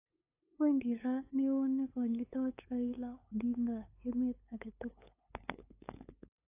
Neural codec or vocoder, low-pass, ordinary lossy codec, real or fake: codec, 16 kHz, 8 kbps, FunCodec, trained on LibriTTS, 25 frames a second; 3.6 kHz; AAC, 24 kbps; fake